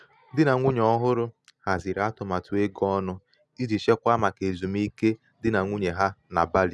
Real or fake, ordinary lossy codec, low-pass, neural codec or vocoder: real; none; none; none